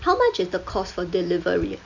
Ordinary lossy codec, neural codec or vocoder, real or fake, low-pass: none; none; real; 7.2 kHz